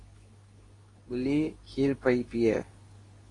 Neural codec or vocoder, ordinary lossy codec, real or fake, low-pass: codec, 24 kHz, 0.9 kbps, WavTokenizer, medium speech release version 2; AAC, 32 kbps; fake; 10.8 kHz